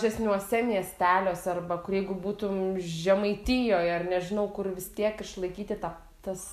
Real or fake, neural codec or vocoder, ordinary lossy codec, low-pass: real; none; AAC, 96 kbps; 14.4 kHz